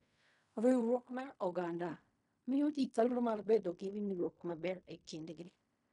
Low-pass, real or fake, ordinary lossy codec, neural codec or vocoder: 10.8 kHz; fake; none; codec, 16 kHz in and 24 kHz out, 0.4 kbps, LongCat-Audio-Codec, fine tuned four codebook decoder